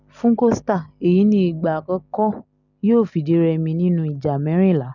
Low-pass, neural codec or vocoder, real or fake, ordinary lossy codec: 7.2 kHz; none; real; none